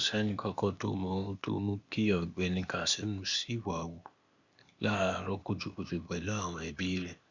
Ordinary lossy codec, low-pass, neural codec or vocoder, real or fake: Opus, 64 kbps; 7.2 kHz; codec, 16 kHz, 0.8 kbps, ZipCodec; fake